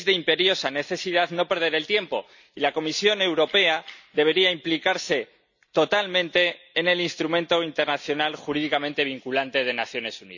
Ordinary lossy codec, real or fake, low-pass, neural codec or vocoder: none; real; 7.2 kHz; none